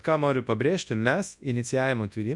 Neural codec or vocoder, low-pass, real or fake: codec, 24 kHz, 0.9 kbps, WavTokenizer, large speech release; 10.8 kHz; fake